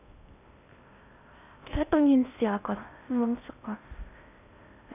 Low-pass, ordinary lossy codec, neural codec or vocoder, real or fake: 3.6 kHz; none; codec, 16 kHz in and 24 kHz out, 0.6 kbps, FocalCodec, streaming, 4096 codes; fake